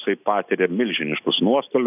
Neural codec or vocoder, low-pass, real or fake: none; 3.6 kHz; real